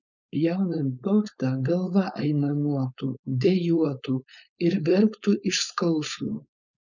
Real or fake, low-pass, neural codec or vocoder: fake; 7.2 kHz; codec, 16 kHz, 4.8 kbps, FACodec